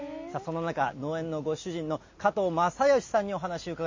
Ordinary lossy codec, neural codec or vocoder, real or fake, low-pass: MP3, 32 kbps; none; real; 7.2 kHz